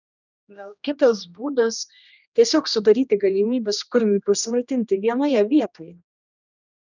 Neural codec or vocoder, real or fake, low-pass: codec, 16 kHz, 1 kbps, X-Codec, HuBERT features, trained on general audio; fake; 7.2 kHz